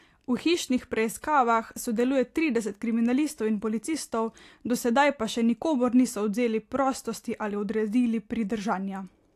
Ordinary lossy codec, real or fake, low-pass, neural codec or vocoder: AAC, 64 kbps; real; 14.4 kHz; none